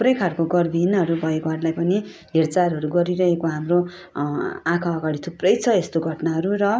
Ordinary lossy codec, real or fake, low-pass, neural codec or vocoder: none; real; none; none